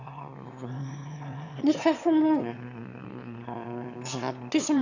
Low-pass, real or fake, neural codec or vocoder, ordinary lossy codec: 7.2 kHz; fake; autoencoder, 22.05 kHz, a latent of 192 numbers a frame, VITS, trained on one speaker; none